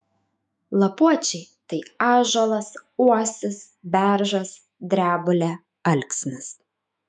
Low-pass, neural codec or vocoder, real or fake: 10.8 kHz; autoencoder, 48 kHz, 128 numbers a frame, DAC-VAE, trained on Japanese speech; fake